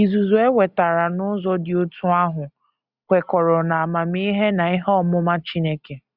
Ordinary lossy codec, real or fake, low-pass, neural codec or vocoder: Opus, 64 kbps; real; 5.4 kHz; none